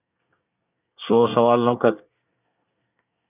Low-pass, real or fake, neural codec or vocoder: 3.6 kHz; fake; codec, 24 kHz, 1 kbps, SNAC